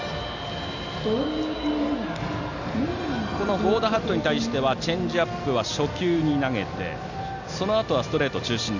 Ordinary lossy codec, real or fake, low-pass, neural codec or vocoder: none; real; 7.2 kHz; none